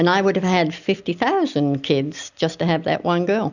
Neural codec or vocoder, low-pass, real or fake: none; 7.2 kHz; real